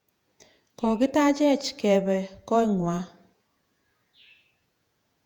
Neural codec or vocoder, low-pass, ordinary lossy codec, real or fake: vocoder, 48 kHz, 128 mel bands, Vocos; 19.8 kHz; none; fake